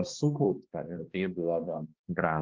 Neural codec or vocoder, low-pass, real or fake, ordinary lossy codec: codec, 16 kHz, 1 kbps, X-Codec, HuBERT features, trained on balanced general audio; 7.2 kHz; fake; Opus, 24 kbps